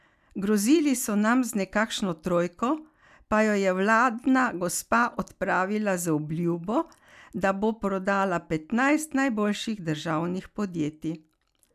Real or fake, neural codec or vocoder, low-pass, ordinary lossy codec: real; none; 14.4 kHz; none